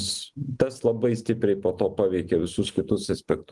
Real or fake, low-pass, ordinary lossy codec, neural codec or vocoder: real; 10.8 kHz; Opus, 24 kbps; none